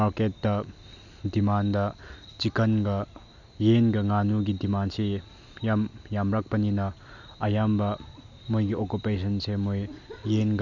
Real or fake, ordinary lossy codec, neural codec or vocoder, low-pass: real; none; none; 7.2 kHz